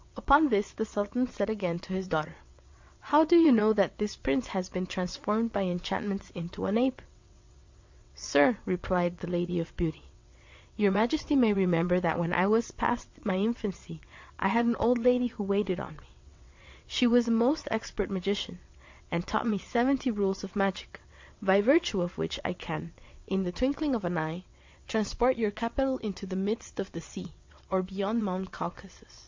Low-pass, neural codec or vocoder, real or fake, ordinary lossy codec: 7.2 kHz; vocoder, 22.05 kHz, 80 mel bands, WaveNeXt; fake; MP3, 64 kbps